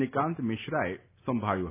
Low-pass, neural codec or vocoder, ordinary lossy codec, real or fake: 3.6 kHz; none; none; real